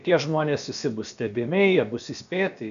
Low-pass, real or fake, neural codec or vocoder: 7.2 kHz; fake; codec, 16 kHz, about 1 kbps, DyCAST, with the encoder's durations